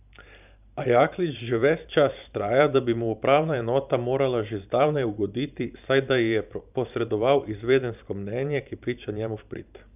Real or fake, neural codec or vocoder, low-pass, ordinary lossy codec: fake; vocoder, 44.1 kHz, 128 mel bands every 512 samples, BigVGAN v2; 3.6 kHz; none